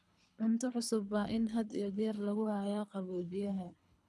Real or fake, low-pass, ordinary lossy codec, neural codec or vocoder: fake; none; none; codec, 24 kHz, 3 kbps, HILCodec